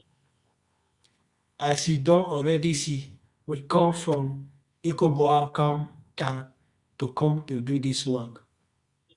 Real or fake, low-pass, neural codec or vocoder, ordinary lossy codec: fake; 10.8 kHz; codec, 24 kHz, 0.9 kbps, WavTokenizer, medium music audio release; Opus, 64 kbps